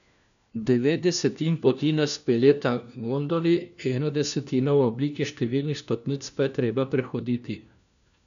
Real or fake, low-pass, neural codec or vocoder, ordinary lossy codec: fake; 7.2 kHz; codec, 16 kHz, 1 kbps, FunCodec, trained on LibriTTS, 50 frames a second; none